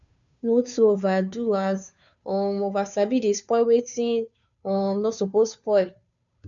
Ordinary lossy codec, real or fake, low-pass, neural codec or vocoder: none; fake; 7.2 kHz; codec, 16 kHz, 2 kbps, FunCodec, trained on Chinese and English, 25 frames a second